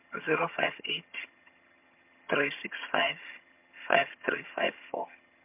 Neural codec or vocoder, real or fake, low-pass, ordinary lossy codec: vocoder, 22.05 kHz, 80 mel bands, HiFi-GAN; fake; 3.6 kHz; MP3, 32 kbps